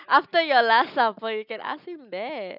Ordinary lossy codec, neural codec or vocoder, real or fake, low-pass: none; none; real; 5.4 kHz